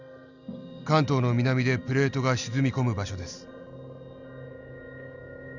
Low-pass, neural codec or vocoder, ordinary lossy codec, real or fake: 7.2 kHz; none; none; real